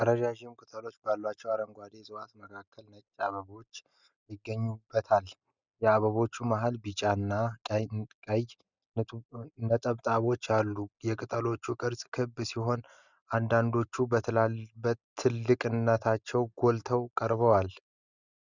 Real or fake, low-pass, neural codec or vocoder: real; 7.2 kHz; none